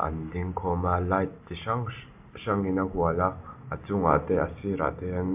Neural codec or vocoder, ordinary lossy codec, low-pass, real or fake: none; MP3, 32 kbps; 3.6 kHz; real